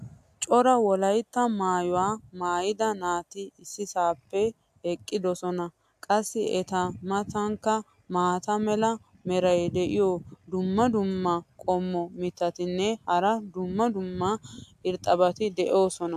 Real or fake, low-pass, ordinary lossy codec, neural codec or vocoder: real; 14.4 kHz; AAC, 96 kbps; none